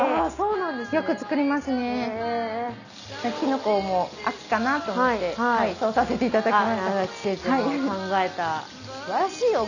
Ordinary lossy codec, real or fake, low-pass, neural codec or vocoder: none; real; 7.2 kHz; none